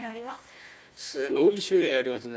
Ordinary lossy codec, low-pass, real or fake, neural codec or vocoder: none; none; fake; codec, 16 kHz, 1 kbps, FunCodec, trained on Chinese and English, 50 frames a second